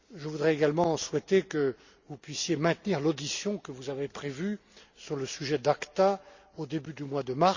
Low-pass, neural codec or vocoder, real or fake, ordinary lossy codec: 7.2 kHz; none; real; Opus, 64 kbps